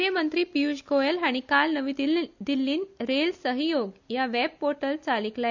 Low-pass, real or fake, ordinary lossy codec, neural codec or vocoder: 7.2 kHz; real; none; none